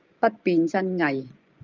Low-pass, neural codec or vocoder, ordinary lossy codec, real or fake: 7.2 kHz; vocoder, 24 kHz, 100 mel bands, Vocos; Opus, 24 kbps; fake